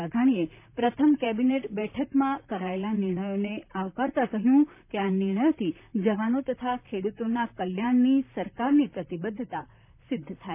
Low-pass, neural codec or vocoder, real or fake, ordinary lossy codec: 3.6 kHz; vocoder, 44.1 kHz, 128 mel bands, Pupu-Vocoder; fake; none